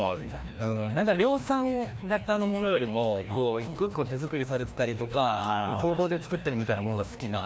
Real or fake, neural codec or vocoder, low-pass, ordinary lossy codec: fake; codec, 16 kHz, 1 kbps, FreqCodec, larger model; none; none